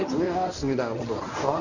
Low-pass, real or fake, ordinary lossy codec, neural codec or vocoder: 7.2 kHz; fake; none; codec, 24 kHz, 0.9 kbps, WavTokenizer, medium speech release version 1